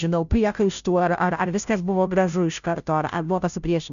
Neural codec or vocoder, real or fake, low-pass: codec, 16 kHz, 0.5 kbps, FunCodec, trained on Chinese and English, 25 frames a second; fake; 7.2 kHz